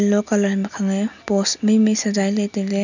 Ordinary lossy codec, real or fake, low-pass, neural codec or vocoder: none; real; 7.2 kHz; none